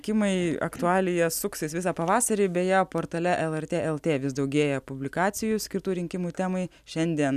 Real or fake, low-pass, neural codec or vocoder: real; 14.4 kHz; none